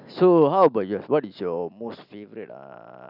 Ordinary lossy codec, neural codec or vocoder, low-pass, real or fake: none; none; 5.4 kHz; real